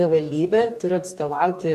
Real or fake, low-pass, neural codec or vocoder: fake; 14.4 kHz; codec, 44.1 kHz, 2.6 kbps, DAC